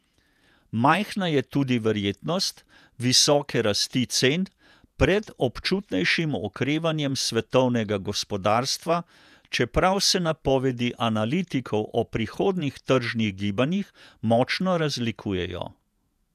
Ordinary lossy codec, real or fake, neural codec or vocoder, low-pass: none; fake; vocoder, 48 kHz, 128 mel bands, Vocos; 14.4 kHz